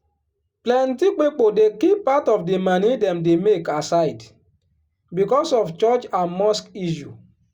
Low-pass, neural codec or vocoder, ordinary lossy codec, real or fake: 19.8 kHz; none; Opus, 64 kbps; real